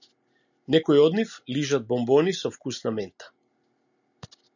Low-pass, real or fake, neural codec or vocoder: 7.2 kHz; real; none